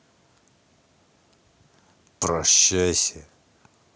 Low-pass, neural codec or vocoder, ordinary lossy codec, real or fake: none; none; none; real